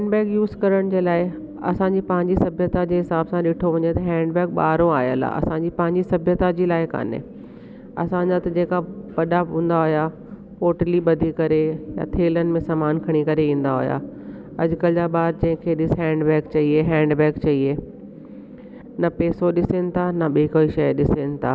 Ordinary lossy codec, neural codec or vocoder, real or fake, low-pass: none; none; real; none